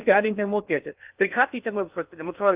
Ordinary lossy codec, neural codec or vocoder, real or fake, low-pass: Opus, 24 kbps; codec, 16 kHz in and 24 kHz out, 0.6 kbps, FocalCodec, streaming, 2048 codes; fake; 3.6 kHz